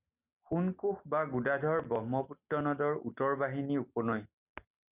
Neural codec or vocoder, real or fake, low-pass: none; real; 3.6 kHz